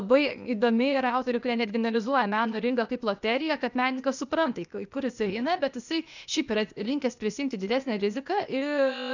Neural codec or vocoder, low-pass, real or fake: codec, 16 kHz, 0.8 kbps, ZipCodec; 7.2 kHz; fake